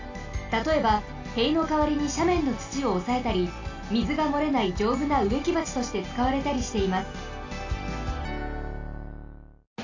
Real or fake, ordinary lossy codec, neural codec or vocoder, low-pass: real; AAC, 48 kbps; none; 7.2 kHz